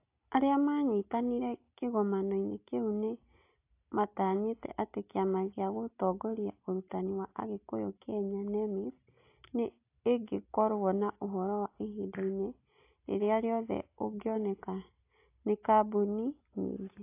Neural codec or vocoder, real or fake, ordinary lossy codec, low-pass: none; real; AAC, 24 kbps; 3.6 kHz